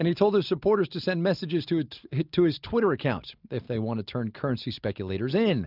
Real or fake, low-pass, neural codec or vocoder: real; 5.4 kHz; none